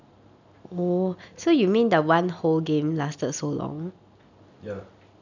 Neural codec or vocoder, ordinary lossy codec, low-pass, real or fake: none; none; 7.2 kHz; real